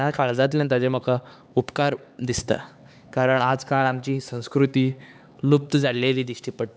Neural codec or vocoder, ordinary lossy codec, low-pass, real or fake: codec, 16 kHz, 4 kbps, X-Codec, HuBERT features, trained on LibriSpeech; none; none; fake